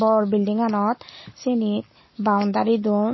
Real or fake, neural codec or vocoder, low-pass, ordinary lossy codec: real; none; 7.2 kHz; MP3, 24 kbps